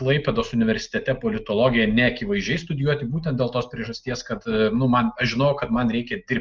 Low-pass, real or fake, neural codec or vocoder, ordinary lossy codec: 7.2 kHz; real; none; Opus, 24 kbps